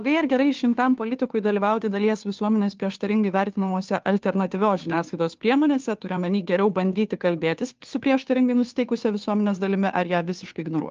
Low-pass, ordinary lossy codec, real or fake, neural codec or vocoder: 7.2 kHz; Opus, 24 kbps; fake; codec, 16 kHz, 2 kbps, FunCodec, trained on Chinese and English, 25 frames a second